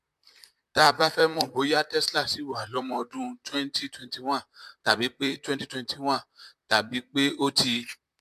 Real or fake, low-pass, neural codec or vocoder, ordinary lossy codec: fake; 14.4 kHz; vocoder, 44.1 kHz, 128 mel bands, Pupu-Vocoder; MP3, 96 kbps